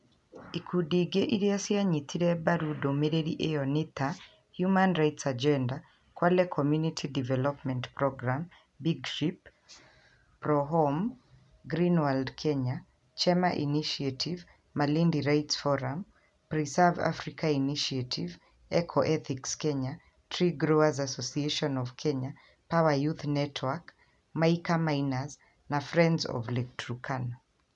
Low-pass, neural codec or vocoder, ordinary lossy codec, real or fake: 10.8 kHz; none; none; real